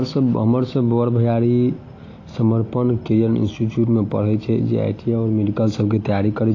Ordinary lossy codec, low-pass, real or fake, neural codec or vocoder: AAC, 32 kbps; 7.2 kHz; real; none